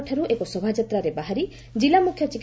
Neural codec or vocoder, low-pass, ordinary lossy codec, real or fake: none; none; none; real